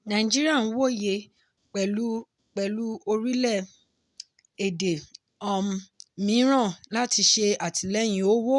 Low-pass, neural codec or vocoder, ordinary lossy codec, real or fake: 10.8 kHz; none; none; real